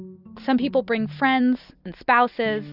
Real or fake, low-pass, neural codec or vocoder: real; 5.4 kHz; none